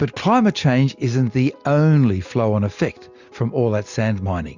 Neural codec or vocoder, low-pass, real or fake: none; 7.2 kHz; real